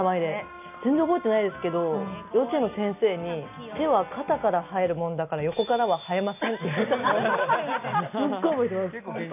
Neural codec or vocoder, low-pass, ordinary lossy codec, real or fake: none; 3.6 kHz; none; real